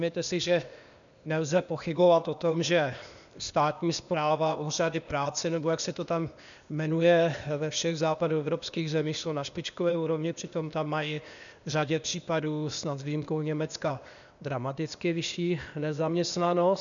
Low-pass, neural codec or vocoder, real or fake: 7.2 kHz; codec, 16 kHz, 0.8 kbps, ZipCodec; fake